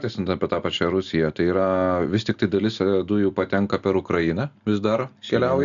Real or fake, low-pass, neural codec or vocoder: real; 7.2 kHz; none